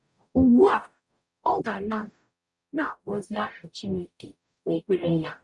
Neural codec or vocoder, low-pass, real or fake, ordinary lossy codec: codec, 44.1 kHz, 0.9 kbps, DAC; 10.8 kHz; fake; none